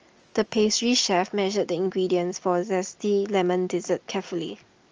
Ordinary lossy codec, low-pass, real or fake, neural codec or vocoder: Opus, 24 kbps; 7.2 kHz; real; none